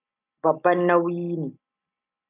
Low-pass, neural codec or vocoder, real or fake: 3.6 kHz; none; real